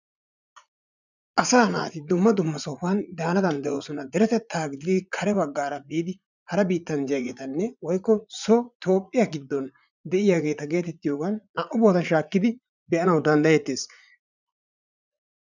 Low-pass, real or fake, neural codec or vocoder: 7.2 kHz; fake; vocoder, 44.1 kHz, 80 mel bands, Vocos